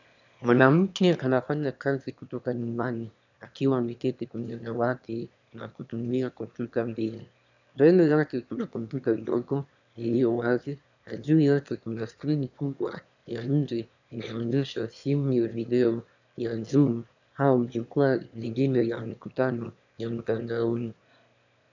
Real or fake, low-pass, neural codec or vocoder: fake; 7.2 kHz; autoencoder, 22.05 kHz, a latent of 192 numbers a frame, VITS, trained on one speaker